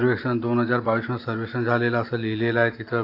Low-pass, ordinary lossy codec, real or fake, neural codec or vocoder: 5.4 kHz; AAC, 32 kbps; real; none